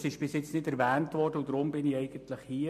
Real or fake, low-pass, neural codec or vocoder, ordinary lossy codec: real; 14.4 kHz; none; MP3, 64 kbps